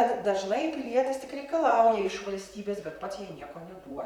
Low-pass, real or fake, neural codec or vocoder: 19.8 kHz; fake; vocoder, 44.1 kHz, 128 mel bands, Pupu-Vocoder